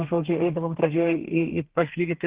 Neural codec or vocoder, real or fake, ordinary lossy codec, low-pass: codec, 32 kHz, 1.9 kbps, SNAC; fake; Opus, 16 kbps; 3.6 kHz